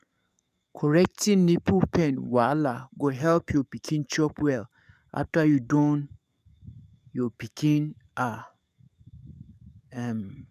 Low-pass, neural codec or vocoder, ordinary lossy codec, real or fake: 14.4 kHz; codec, 44.1 kHz, 7.8 kbps, DAC; none; fake